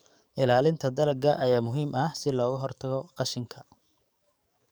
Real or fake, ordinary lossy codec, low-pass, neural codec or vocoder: fake; none; none; codec, 44.1 kHz, 7.8 kbps, DAC